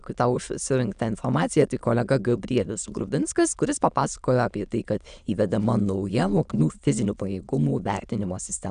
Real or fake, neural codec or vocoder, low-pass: fake; autoencoder, 22.05 kHz, a latent of 192 numbers a frame, VITS, trained on many speakers; 9.9 kHz